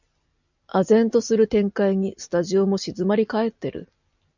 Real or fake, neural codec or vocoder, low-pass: real; none; 7.2 kHz